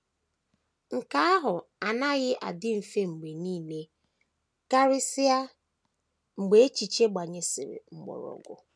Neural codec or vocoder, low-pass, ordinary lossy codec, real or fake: none; none; none; real